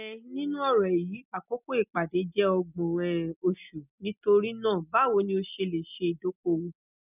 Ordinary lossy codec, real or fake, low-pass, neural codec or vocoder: none; real; 3.6 kHz; none